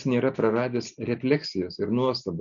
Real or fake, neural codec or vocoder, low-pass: real; none; 7.2 kHz